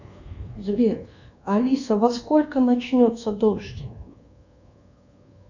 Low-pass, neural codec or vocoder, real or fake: 7.2 kHz; codec, 24 kHz, 1.2 kbps, DualCodec; fake